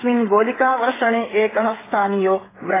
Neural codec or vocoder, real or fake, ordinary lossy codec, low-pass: codec, 16 kHz, 8 kbps, FreqCodec, smaller model; fake; AAC, 16 kbps; 3.6 kHz